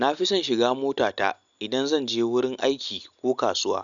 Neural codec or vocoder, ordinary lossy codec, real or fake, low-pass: none; none; real; 7.2 kHz